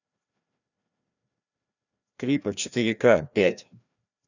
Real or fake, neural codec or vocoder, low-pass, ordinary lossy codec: fake; codec, 16 kHz, 1 kbps, FreqCodec, larger model; 7.2 kHz; none